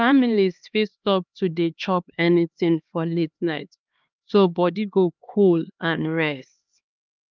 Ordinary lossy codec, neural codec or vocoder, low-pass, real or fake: Opus, 24 kbps; codec, 16 kHz, 2 kbps, X-Codec, HuBERT features, trained on LibriSpeech; 7.2 kHz; fake